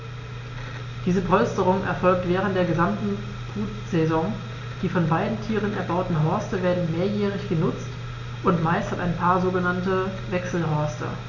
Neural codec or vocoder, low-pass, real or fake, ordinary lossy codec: none; 7.2 kHz; real; none